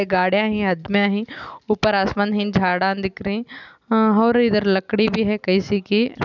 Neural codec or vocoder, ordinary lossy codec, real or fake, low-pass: none; none; real; 7.2 kHz